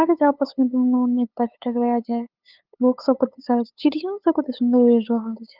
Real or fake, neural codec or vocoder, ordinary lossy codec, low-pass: fake; codec, 16 kHz, 8 kbps, FunCodec, trained on LibriTTS, 25 frames a second; Opus, 24 kbps; 5.4 kHz